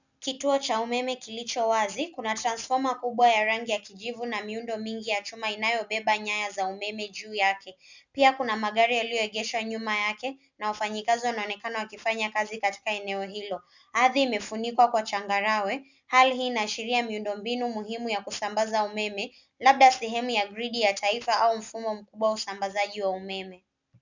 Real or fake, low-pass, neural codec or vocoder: real; 7.2 kHz; none